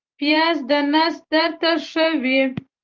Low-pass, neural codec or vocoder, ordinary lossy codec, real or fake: 7.2 kHz; vocoder, 44.1 kHz, 128 mel bands every 512 samples, BigVGAN v2; Opus, 32 kbps; fake